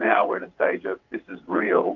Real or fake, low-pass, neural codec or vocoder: fake; 7.2 kHz; vocoder, 22.05 kHz, 80 mel bands, WaveNeXt